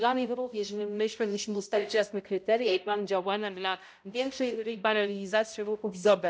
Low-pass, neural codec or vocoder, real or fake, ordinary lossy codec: none; codec, 16 kHz, 0.5 kbps, X-Codec, HuBERT features, trained on balanced general audio; fake; none